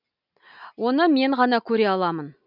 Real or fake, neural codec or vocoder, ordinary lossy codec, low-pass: real; none; none; 5.4 kHz